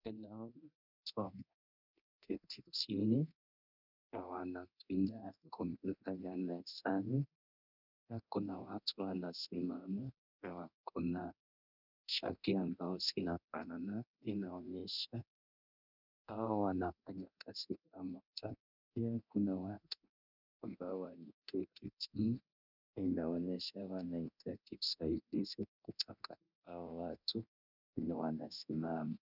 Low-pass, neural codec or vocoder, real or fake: 5.4 kHz; codec, 24 kHz, 0.9 kbps, DualCodec; fake